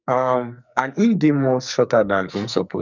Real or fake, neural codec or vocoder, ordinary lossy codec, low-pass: fake; codec, 44.1 kHz, 2.6 kbps, SNAC; none; 7.2 kHz